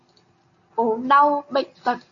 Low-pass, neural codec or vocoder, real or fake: 7.2 kHz; none; real